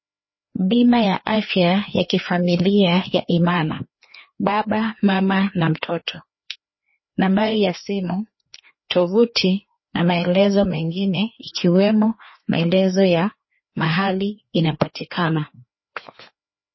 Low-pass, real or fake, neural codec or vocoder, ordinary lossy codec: 7.2 kHz; fake; codec, 16 kHz, 2 kbps, FreqCodec, larger model; MP3, 24 kbps